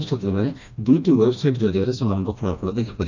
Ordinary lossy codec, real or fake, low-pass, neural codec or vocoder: none; fake; 7.2 kHz; codec, 16 kHz, 1 kbps, FreqCodec, smaller model